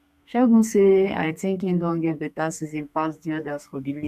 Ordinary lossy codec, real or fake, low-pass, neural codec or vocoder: none; fake; 14.4 kHz; codec, 32 kHz, 1.9 kbps, SNAC